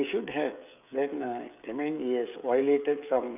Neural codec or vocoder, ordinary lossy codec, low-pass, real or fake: codec, 16 kHz, 16 kbps, FreqCodec, smaller model; none; 3.6 kHz; fake